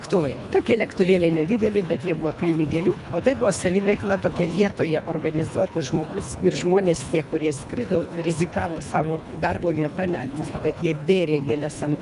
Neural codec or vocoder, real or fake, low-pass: codec, 24 kHz, 1.5 kbps, HILCodec; fake; 10.8 kHz